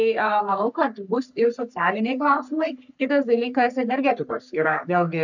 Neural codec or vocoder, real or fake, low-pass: codec, 44.1 kHz, 3.4 kbps, Pupu-Codec; fake; 7.2 kHz